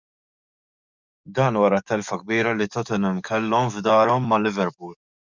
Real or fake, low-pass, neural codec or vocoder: fake; 7.2 kHz; codec, 44.1 kHz, 7.8 kbps, DAC